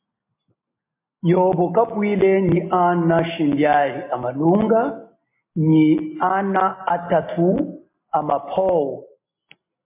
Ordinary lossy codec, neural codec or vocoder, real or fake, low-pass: MP3, 24 kbps; none; real; 3.6 kHz